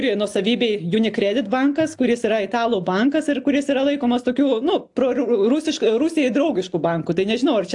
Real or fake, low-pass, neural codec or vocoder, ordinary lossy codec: real; 10.8 kHz; none; AAC, 64 kbps